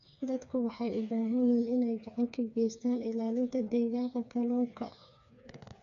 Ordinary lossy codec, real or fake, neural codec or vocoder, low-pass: none; fake; codec, 16 kHz, 4 kbps, FreqCodec, smaller model; 7.2 kHz